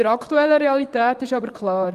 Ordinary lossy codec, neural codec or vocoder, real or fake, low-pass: Opus, 32 kbps; vocoder, 44.1 kHz, 128 mel bands, Pupu-Vocoder; fake; 14.4 kHz